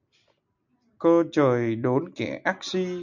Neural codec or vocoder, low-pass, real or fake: none; 7.2 kHz; real